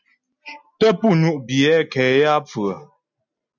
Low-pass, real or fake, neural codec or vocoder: 7.2 kHz; real; none